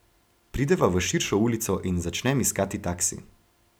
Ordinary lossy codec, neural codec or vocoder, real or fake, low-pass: none; none; real; none